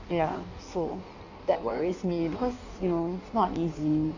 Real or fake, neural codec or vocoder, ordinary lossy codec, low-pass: fake; codec, 16 kHz in and 24 kHz out, 1.1 kbps, FireRedTTS-2 codec; none; 7.2 kHz